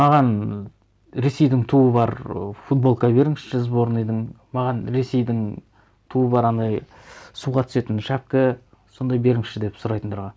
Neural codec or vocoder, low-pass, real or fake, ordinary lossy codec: none; none; real; none